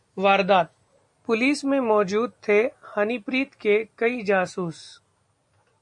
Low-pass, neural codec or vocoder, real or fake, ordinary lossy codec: 10.8 kHz; none; real; MP3, 64 kbps